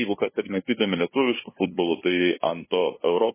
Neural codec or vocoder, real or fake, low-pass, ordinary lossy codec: codec, 16 kHz, 2 kbps, FunCodec, trained on LibriTTS, 25 frames a second; fake; 3.6 kHz; MP3, 16 kbps